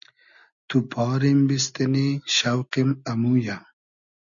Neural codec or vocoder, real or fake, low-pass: none; real; 7.2 kHz